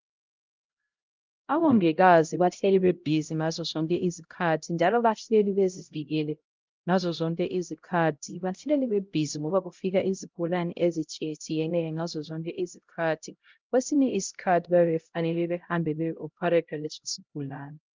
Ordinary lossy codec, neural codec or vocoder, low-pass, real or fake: Opus, 32 kbps; codec, 16 kHz, 0.5 kbps, X-Codec, HuBERT features, trained on LibriSpeech; 7.2 kHz; fake